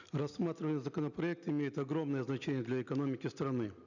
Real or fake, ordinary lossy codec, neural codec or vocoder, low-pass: real; none; none; 7.2 kHz